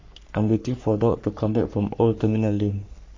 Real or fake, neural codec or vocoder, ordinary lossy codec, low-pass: fake; codec, 44.1 kHz, 3.4 kbps, Pupu-Codec; MP3, 48 kbps; 7.2 kHz